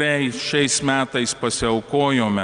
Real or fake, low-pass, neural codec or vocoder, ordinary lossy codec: real; 9.9 kHz; none; Opus, 32 kbps